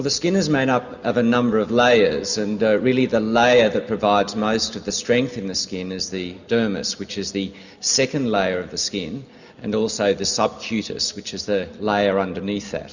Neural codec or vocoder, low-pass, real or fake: none; 7.2 kHz; real